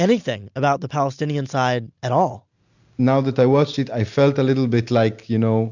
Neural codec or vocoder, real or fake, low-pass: none; real; 7.2 kHz